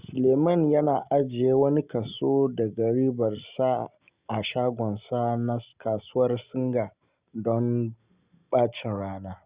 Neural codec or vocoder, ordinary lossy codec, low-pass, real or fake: none; Opus, 64 kbps; 3.6 kHz; real